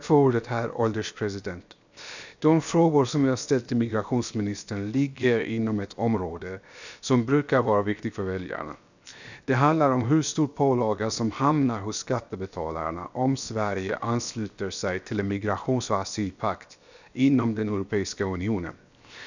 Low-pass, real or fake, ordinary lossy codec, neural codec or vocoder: 7.2 kHz; fake; none; codec, 16 kHz, 0.7 kbps, FocalCodec